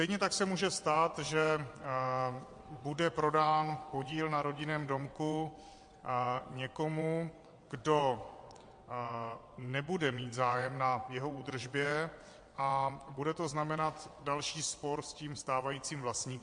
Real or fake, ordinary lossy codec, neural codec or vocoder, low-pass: fake; MP3, 48 kbps; vocoder, 22.05 kHz, 80 mel bands, WaveNeXt; 9.9 kHz